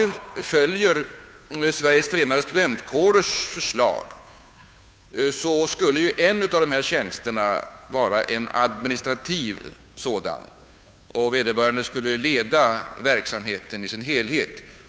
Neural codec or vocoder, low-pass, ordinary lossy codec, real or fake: codec, 16 kHz, 2 kbps, FunCodec, trained on Chinese and English, 25 frames a second; none; none; fake